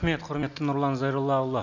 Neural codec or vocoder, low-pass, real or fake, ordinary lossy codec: none; 7.2 kHz; real; none